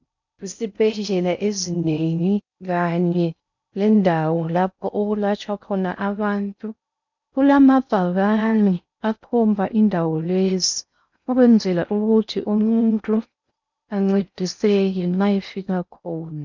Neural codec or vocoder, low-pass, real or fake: codec, 16 kHz in and 24 kHz out, 0.6 kbps, FocalCodec, streaming, 4096 codes; 7.2 kHz; fake